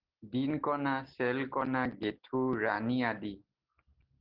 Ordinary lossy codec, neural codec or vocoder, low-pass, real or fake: Opus, 16 kbps; none; 5.4 kHz; real